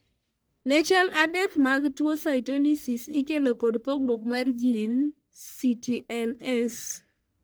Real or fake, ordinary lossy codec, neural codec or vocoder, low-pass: fake; none; codec, 44.1 kHz, 1.7 kbps, Pupu-Codec; none